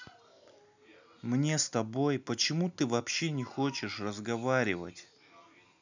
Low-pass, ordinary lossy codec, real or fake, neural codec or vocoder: 7.2 kHz; none; real; none